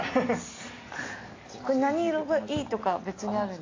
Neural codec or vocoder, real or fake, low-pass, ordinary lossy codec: none; real; 7.2 kHz; AAC, 32 kbps